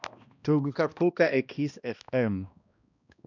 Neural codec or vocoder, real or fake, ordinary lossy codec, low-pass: codec, 16 kHz, 1 kbps, X-Codec, HuBERT features, trained on balanced general audio; fake; none; 7.2 kHz